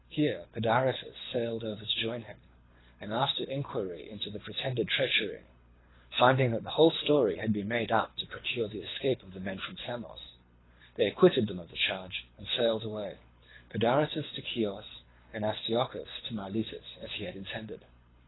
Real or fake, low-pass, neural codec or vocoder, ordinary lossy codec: fake; 7.2 kHz; codec, 24 kHz, 6 kbps, HILCodec; AAC, 16 kbps